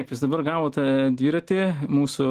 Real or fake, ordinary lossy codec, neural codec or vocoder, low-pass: real; Opus, 16 kbps; none; 14.4 kHz